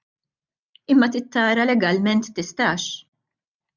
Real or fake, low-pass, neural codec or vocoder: real; 7.2 kHz; none